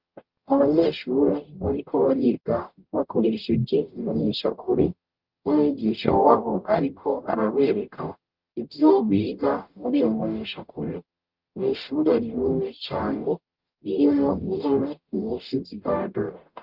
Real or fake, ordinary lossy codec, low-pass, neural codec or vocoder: fake; Opus, 24 kbps; 5.4 kHz; codec, 44.1 kHz, 0.9 kbps, DAC